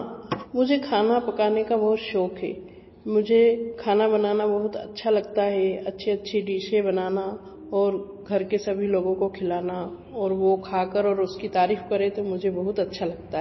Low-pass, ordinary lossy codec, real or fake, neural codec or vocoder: 7.2 kHz; MP3, 24 kbps; real; none